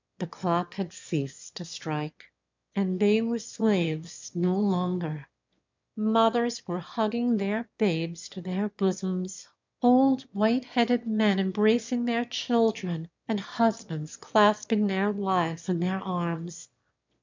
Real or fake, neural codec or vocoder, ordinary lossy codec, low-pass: fake; autoencoder, 22.05 kHz, a latent of 192 numbers a frame, VITS, trained on one speaker; MP3, 64 kbps; 7.2 kHz